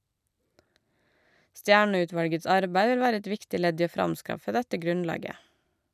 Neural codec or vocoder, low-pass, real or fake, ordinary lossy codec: none; 14.4 kHz; real; none